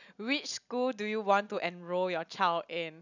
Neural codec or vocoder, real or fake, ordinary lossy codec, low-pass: none; real; none; 7.2 kHz